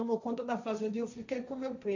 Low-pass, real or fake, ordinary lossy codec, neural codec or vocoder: 7.2 kHz; fake; none; codec, 16 kHz, 1.1 kbps, Voila-Tokenizer